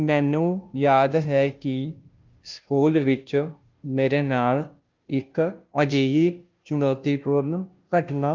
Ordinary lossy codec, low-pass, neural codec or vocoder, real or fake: Opus, 24 kbps; 7.2 kHz; codec, 16 kHz, 0.5 kbps, FunCodec, trained on Chinese and English, 25 frames a second; fake